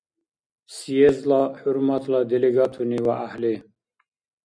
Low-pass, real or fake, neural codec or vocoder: 9.9 kHz; real; none